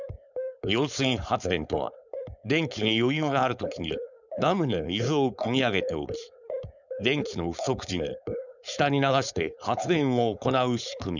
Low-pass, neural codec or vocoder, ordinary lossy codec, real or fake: 7.2 kHz; codec, 16 kHz, 4.8 kbps, FACodec; none; fake